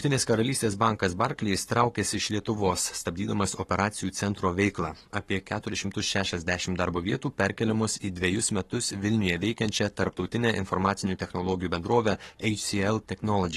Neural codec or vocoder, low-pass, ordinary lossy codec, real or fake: codec, 44.1 kHz, 7.8 kbps, Pupu-Codec; 19.8 kHz; AAC, 32 kbps; fake